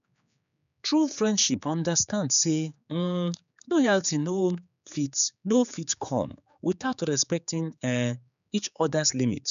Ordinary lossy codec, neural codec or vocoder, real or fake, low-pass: none; codec, 16 kHz, 4 kbps, X-Codec, HuBERT features, trained on general audio; fake; 7.2 kHz